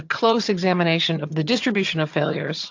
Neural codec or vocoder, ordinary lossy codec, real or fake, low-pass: vocoder, 22.05 kHz, 80 mel bands, HiFi-GAN; AAC, 48 kbps; fake; 7.2 kHz